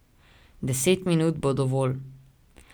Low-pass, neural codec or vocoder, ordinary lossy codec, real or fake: none; none; none; real